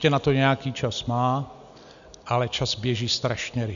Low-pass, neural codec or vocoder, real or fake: 7.2 kHz; none; real